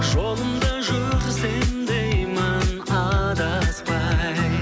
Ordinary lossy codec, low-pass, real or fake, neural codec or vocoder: none; none; real; none